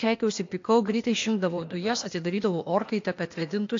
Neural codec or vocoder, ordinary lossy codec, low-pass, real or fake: codec, 16 kHz, 0.8 kbps, ZipCodec; AAC, 48 kbps; 7.2 kHz; fake